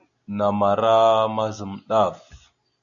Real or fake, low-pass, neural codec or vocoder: real; 7.2 kHz; none